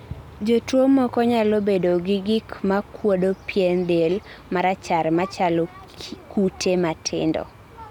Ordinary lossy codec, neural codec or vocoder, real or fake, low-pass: none; none; real; 19.8 kHz